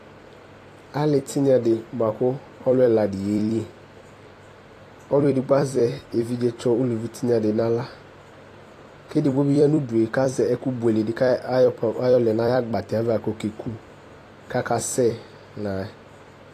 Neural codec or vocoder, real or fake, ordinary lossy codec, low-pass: vocoder, 44.1 kHz, 128 mel bands every 256 samples, BigVGAN v2; fake; AAC, 48 kbps; 14.4 kHz